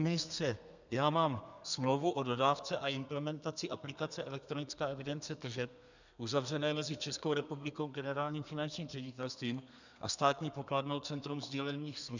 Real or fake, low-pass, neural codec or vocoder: fake; 7.2 kHz; codec, 32 kHz, 1.9 kbps, SNAC